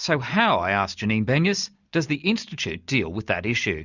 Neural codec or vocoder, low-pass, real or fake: none; 7.2 kHz; real